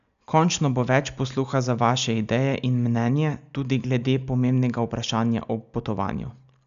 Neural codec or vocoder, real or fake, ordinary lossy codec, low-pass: none; real; none; 7.2 kHz